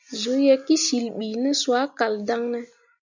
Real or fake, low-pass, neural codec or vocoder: real; 7.2 kHz; none